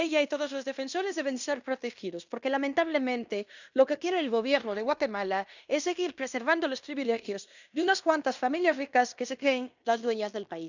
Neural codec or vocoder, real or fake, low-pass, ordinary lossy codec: codec, 16 kHz in and 24 kHz out, 0.9 kbps, LongCat-Audio-Codec, fine tuned four codebook decoder; fake; 7.2 kHz; none